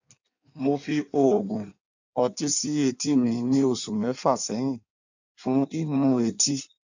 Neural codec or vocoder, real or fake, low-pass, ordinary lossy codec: codec, 16 kHz in and 24 kHz out, 1.1 kbps, FireRedTTS-2 codec; fake; 7.2 kHz; none